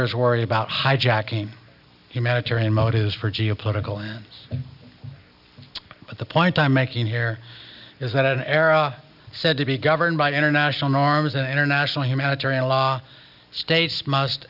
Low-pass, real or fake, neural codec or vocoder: 5.4 kHz; real; none